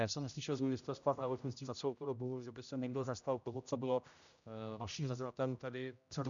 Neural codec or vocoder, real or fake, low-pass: codec, 16 kHz, 0.5 kbps, X-Codec, HuBERT features, trained on general audio; fake; 7.2 kHz